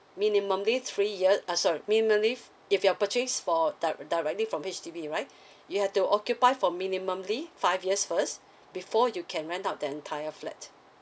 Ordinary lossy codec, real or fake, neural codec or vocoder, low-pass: none; real; none; none